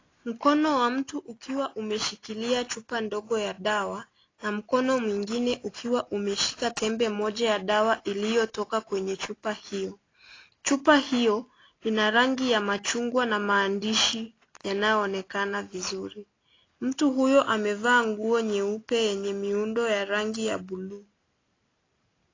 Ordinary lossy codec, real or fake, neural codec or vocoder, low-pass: AAC, 32 kbps; real; none; 7.2 kHz